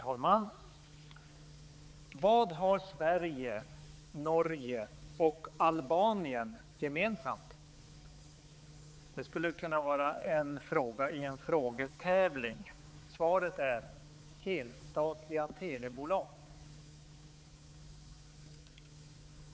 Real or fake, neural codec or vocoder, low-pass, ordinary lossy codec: fake; codec, 16 kHz, 4 kbps, X-Codec, HuBERT features, trained on balanced general audio; none; none